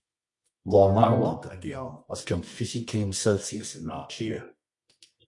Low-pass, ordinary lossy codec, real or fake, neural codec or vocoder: 10.8 kHz; MP3, 48 kbps; fake; codec, 24 kHz, 0.9 kbps, WavTokenizer, medium music audio release